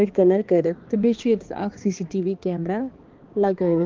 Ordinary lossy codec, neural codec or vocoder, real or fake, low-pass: Opus, 32 kbps; codec, 16 kHz, 2 kbps, X-Codec, HuBERT features, trained on balanced general audio; fake; 7.2 kHz